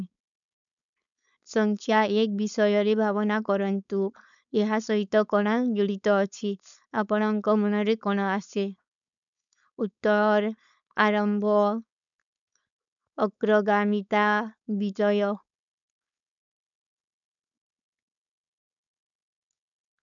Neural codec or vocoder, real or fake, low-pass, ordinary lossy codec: codec, 16 kHz, 4.8 kbps, FACodec; fake; 7.2 kHz; none